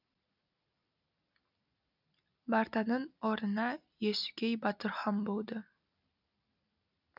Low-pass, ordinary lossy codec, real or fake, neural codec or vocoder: 5.4 kHz; none; real; none